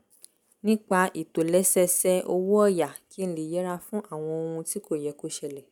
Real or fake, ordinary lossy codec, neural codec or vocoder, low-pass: real; none; none; none